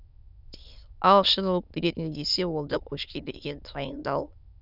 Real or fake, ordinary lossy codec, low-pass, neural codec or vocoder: fake; none; 5.4 kHz; autoencoder, 22.05 kHz, a latent of 192 numbers a frame, VITS, trained on many speakers